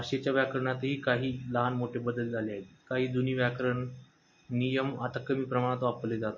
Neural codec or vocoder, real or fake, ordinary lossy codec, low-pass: none; real; MP3, 32 kbps; 7.2 kHz